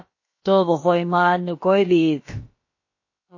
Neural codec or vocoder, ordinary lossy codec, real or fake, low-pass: codec, 16 kHz, about 1 kbps, DyCAST, with the encoder's durations; MP3, 32 kbps; fake; 7.2 kHz